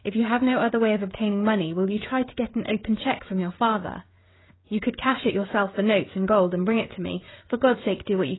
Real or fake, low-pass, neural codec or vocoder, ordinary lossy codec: fake; 7.2 kHz; codec, 16 kHz, 16 kbps, FreqCodec, smaller model; AAC, 16 kbps